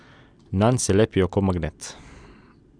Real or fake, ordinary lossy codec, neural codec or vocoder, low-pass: real; none; none; 9.9 kHz